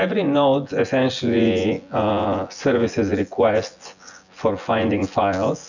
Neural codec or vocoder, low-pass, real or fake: vocoder, 24 kHz, 100 mel bands, Vocos; 7.2 kHz; fake